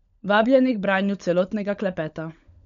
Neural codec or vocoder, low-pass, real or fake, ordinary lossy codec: codec, 16 kHz, 16 kbps, FunCodec, trained on LibriTTS, 50 frames a second; 7.2 kHz; fake; none